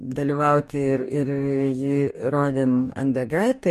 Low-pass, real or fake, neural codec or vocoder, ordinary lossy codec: 14.4 kHz; fake; codec, 44.1 kHz, 2.6 kbps, DAC; MP3, 64 kbps